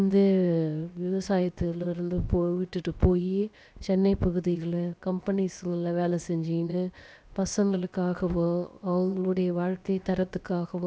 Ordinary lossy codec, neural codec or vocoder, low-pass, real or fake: none; codec, 16 kHz, 0.7 kbps, FocalCodec; none; fake